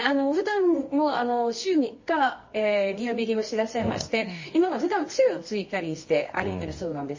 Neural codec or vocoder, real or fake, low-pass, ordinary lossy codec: codec, 24 kHz, 0.9 kbps, WavTokenizer, medium music audio release; fake; 7.2 kHz; MP3, 32 kbps